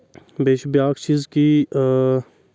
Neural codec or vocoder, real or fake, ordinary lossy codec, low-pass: none; real; none; none